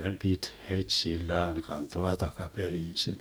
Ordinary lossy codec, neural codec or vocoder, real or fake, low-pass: none; codec, 44.1 kHz, 2.6 kbps, DAC; fake; none